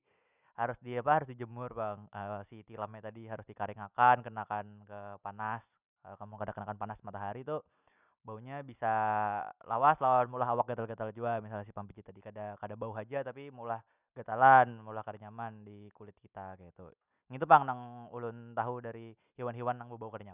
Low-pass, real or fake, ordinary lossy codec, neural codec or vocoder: 3.6 kHz; real; none; none